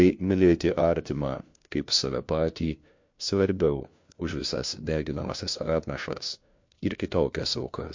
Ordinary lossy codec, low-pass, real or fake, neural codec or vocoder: MP3, 48 kbps; 7.2 kHz; fake; codec, 16 kHz, 1 kbps, FunCodec, trained on LibriTTS, 50 frames a second